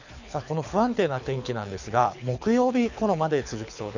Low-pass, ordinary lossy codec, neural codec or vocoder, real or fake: 7.2 kHz; none; codec, 24 kHz, 6 kbps, HILCodec; fake